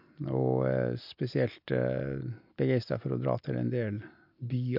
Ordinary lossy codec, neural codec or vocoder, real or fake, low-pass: MP3, 48 kbps; none; real; 5.4 kHz